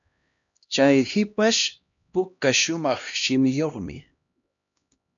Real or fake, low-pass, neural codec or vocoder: fake; 7.2 kHz; codec, 16 kHz, 1 kbps, X-Codec, HuBERT features, trained on LibriSpeech